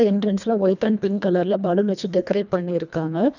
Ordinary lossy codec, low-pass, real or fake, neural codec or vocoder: none; 7.2 kHz; fake; codec, 24 kHz, 1.5 kbps, HILCodec